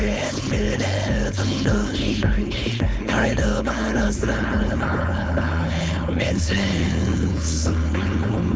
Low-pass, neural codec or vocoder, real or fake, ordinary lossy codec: none; codec, 16 kHz, 4.8 kbps, FACodec; fake; none